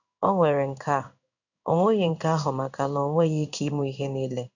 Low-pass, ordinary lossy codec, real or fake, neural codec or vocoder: 7.2 kHz; none; fake; codec, 16 kHz in and 24 kHz out, 1 kbps, XY-Tokenizer